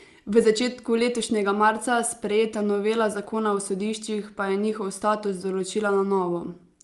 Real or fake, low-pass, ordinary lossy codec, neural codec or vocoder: real; 10.8 kHz; Opus, 32 kbps; none